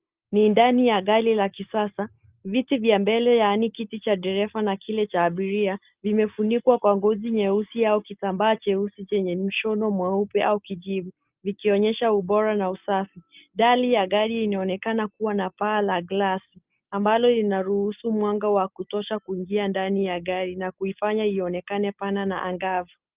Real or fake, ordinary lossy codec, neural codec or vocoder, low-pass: real; Opus, 24 kbps; none; 3.6 kHz